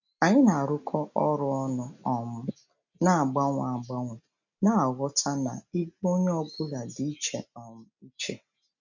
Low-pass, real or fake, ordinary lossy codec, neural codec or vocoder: 7.2 kHz; real; none; none